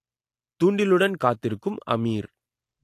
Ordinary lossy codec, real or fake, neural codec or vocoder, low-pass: AAC, 64 kbps; real; none; 14.4 kHz